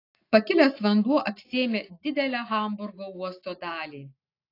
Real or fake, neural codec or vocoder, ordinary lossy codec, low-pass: real; none; AAC, 32 kbps; 5.4 kHz